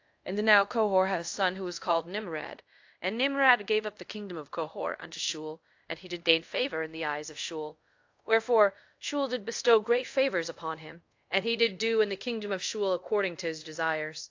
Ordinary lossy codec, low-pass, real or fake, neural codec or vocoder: AAC, 48 kbps; 7.2 kHz; fake; codec, 24 kHz, 0.5 kbps, DualCodec